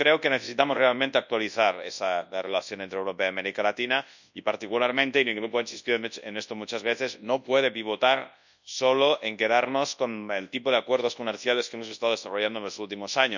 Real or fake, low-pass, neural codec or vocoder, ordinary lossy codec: fake; 7.2 kHz; codec, 24 kHz, 0.9 kbps, WavTokenizer, large speech release; none